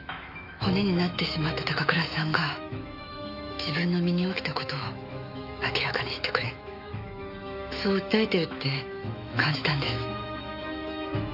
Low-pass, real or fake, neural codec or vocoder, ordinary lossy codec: 5.4 kHz; fake; autoencoder, 48 kHz, 128 numbers a frame, DAC-VAE, trained on Japanese speech; none